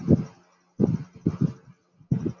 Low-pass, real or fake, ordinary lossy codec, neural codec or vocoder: 7.2 kHz; real; MP3, 48 kbps; none